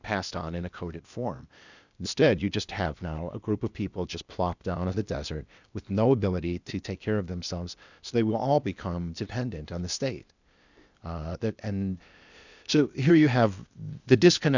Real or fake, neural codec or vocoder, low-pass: fake; codec, 16 kHz, 0.8 kbps, ZipCodec; 7.2 kHz